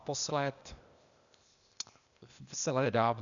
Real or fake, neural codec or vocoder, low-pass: fake; codec, 16 kHz, 0.8 kbps, ZipCodec; 7.2 kHz